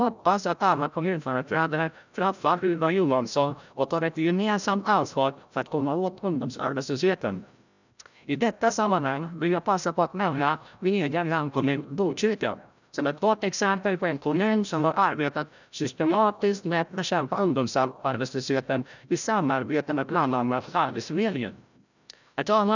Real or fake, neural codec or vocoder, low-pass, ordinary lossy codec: fake; codec, 16 kHz, 0.5 kbps, FreqCodec, larger model; 7.2 kHz; none